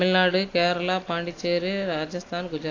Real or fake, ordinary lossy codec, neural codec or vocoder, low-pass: real; AAC, 32 kbps; none; 7.2 kHz